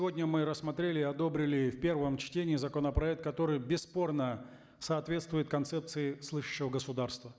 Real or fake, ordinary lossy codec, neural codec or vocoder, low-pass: real; none; none; none